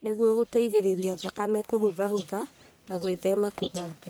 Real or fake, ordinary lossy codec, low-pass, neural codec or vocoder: fake; none; none; codec, 44.1 kHz, 1.7 kbps, Pupu-Codec